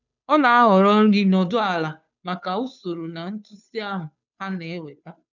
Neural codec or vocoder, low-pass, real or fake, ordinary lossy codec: codec, 16 kHz, 2 kbps, FunCodec, trained on Chinese and English, 25 frames a second; 7.2 kHz; fake; none